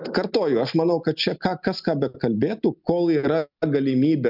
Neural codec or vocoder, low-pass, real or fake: none; 5.4 kHz; real